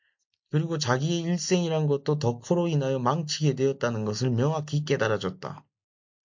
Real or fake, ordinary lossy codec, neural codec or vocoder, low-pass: real; MP3, 48 kbps; none; 7.2 kHz